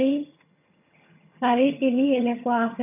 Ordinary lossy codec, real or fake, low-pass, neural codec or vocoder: none; fake; 3.6 kHz; vocoder, 22.05 kHz, 80 mel bands, HiFi-GAN